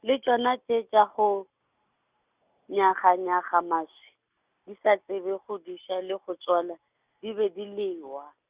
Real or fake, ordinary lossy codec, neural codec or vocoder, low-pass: real; Opus, 64 kbps; none; 3.6 kHz